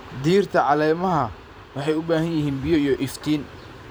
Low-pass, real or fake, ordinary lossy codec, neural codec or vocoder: none; fake; none; vocoder, 44.1 kHz, 128 mel bands every 512 samples, BigVGAN v2